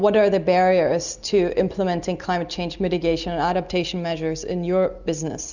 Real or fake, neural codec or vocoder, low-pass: real; none; 7.2 kHz